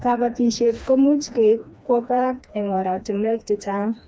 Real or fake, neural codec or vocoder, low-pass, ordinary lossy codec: fake; codec, 16 kHz, 2 kbps, FreqCodec, smaller model; none; none